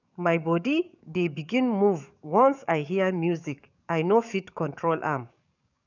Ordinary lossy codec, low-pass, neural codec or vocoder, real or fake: none; 7.2 kHz; codec, 44.1 kHz, 7.8 kbps, DAC; fake